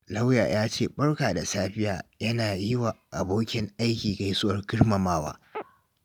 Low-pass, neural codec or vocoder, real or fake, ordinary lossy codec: none; none; real; none